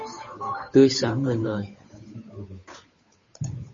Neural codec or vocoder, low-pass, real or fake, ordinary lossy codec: codec, 16 kHz, 8 kbps, FunCodec, trained on Chinese and English, 25 frames a second; 7.2 kHz; fake; MP3, 32 kbps